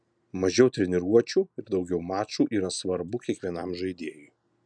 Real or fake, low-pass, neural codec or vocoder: real; 9.9 kHz; none